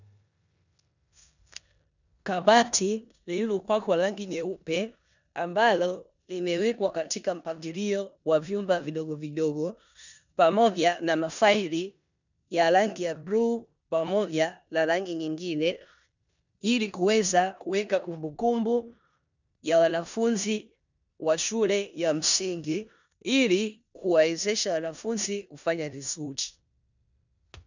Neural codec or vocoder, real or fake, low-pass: codec, 16 kHz in and 24 kHz out, 0.9 kbps, LongCat-Audio-Codec, four codebook decoder; fake; 7.2 kHz